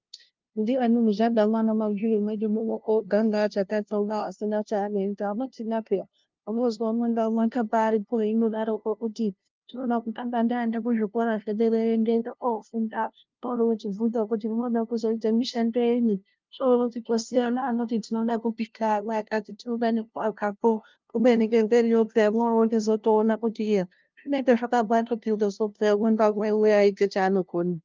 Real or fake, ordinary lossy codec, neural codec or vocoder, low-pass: fake; Opus, 32 kbps; codec, 16 kHz, 0.5 kbps, FunCodec, trained on LibriTTS, 25 frames a second; 7.2 kHz